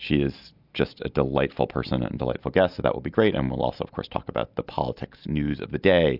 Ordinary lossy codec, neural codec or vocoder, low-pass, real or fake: AAC, 48 kbps; none; 5.4 kHz; real